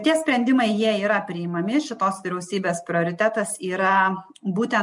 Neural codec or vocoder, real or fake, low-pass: none; real; 10.8 kHz